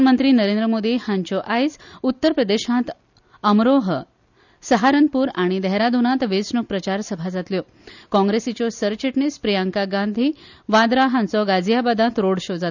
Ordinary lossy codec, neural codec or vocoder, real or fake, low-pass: none; none; real; 7.2 kHz